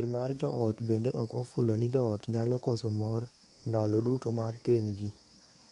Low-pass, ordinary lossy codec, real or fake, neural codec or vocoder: 10.8 kHz; none; fake; codec, 24 kHz, 1 kbps, SNAC